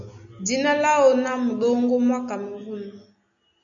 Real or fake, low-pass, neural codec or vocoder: real; 7.2 kHz; none